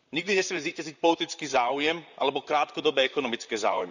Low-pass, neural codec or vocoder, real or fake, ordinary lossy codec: 7.2 kHz; vocoder, 44.1 kHz, 128 mel bands, Pupu-Vocoder; fake; none